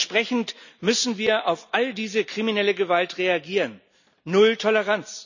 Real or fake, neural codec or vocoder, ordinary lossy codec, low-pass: real; none; none; 7.2 kHz